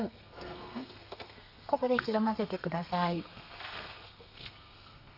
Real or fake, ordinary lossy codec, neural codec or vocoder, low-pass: fake; AAC, 48 kbps; codec, 32 kHz, 1.9 kbps, SNAC; 5.4 kHz